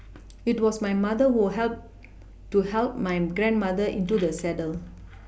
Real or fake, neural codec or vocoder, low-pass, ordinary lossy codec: real; none; none; none